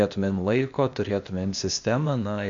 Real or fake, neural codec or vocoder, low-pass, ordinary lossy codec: fake; codec, 16 kHz, 0.8 kbps, ZipCodec; 7.2 kHz; MP3, 48 kbps